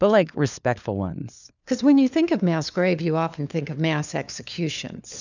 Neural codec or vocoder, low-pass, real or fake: codec, 16 kHz, 4 kbps, FunCodec, trained on LibriTTS, 50 frames a second; 7.2 kHz; fake